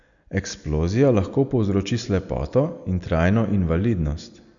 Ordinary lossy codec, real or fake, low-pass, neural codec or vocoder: none; real; 7.2 kHz; none